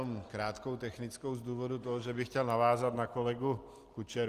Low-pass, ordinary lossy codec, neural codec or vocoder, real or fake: 14.4 kHz; Opus, 24 kbps; none; real